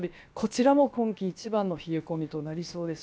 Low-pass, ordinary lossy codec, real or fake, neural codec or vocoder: none; none; fake; codec, 16 kHz, about 1 kbps, DyCAST, with the encoder's durations